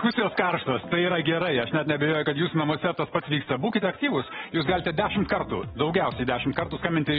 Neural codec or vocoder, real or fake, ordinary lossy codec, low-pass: none; real; AAC, 16 kbps; 19.8 kHz